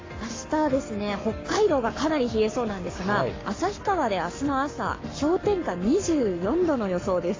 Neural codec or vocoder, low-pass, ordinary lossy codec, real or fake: codec, 44.1 kHz, 7.8 kbps, Pupu-Codec; 7.2 kHz; AAC, 32 kbps; fake